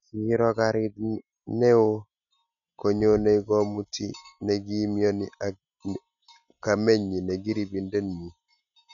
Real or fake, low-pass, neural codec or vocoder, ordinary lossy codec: real; 7.2 kHz; none; none